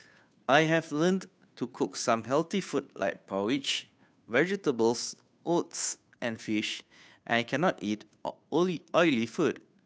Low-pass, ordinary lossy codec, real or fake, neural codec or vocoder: none; none; fake; codec, 16 kHz, 2 kbps, FunCodec, trained on Chinese and English, 25 frames a second